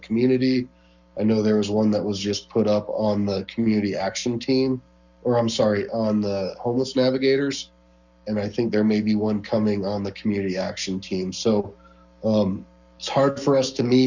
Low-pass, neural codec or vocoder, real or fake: 7.2 kHz; codec, 44.1 kHz, 7.8 kbps, Pupu-Codec; fake